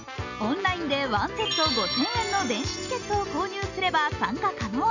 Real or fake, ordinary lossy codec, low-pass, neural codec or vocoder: real; none; 7.2 kHz; none